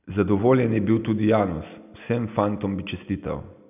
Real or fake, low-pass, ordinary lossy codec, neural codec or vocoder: real; 3.6 kHz; none; none